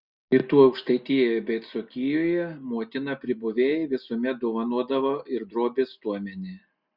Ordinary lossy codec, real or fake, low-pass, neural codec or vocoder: Opus, 64 kbps; real; 5.4 kHz; none